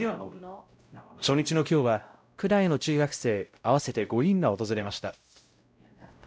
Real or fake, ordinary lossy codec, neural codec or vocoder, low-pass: fake; none; codec, 16 kHz, 0.5 kbps, X-Codec, WavLM features, trained on Multilingual LibriSpeech; none